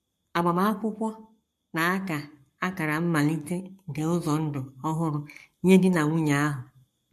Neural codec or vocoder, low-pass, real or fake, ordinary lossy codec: codec, 44.1 kHz, 7.8 kbps, Pupu-Codec; 14.4 kHz; fake; MP3, 64 kbps